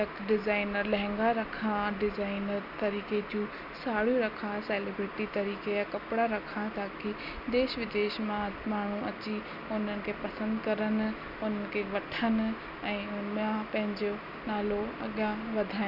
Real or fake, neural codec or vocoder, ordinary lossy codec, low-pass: real; none; none; 5.4 kHz